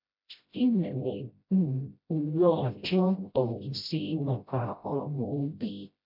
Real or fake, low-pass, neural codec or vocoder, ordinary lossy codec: fake; 5.4 kHz; codec, 16 kHz, 0.5 kbps, FreqCodec, smaller model; MP3, 48 kbps